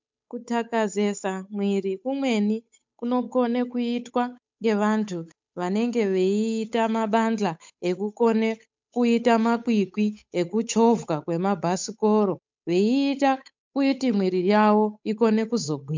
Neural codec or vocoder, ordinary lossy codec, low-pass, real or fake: codec, 16 kHz, 8 kbps, FunCodec, trained on Chinese and English, 25 frames a second; MP3, 64 kbps; 7.2 kHz; fake